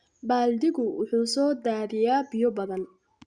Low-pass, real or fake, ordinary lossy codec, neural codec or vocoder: 9.9 kHz; real; Opus, 64 kbps; none